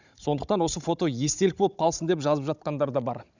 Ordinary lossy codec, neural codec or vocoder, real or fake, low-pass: none; codec, 16 kHz, 16 kbps, FunCodec, trained on Chinese and English, 50 frames a second; fake; 7.2 kHz